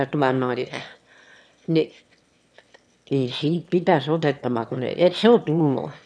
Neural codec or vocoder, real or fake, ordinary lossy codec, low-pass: autoencoder, 22.05 kHz, a latent of 192 numbers a frame, VITS, trained on one speaker; fake; none; none